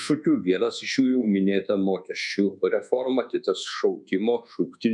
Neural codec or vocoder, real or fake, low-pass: codec, 24 kHz, 1.2 kbps, DualCodec; fake; 10.8 kHz